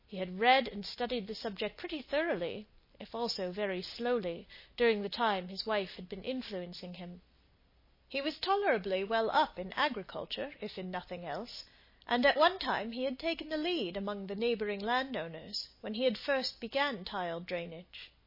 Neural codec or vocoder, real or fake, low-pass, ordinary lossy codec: none; real; 5.4 kHz; MP3, 24 kbps